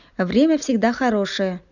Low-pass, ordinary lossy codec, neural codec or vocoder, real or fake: 7.2 kHz; none; none; real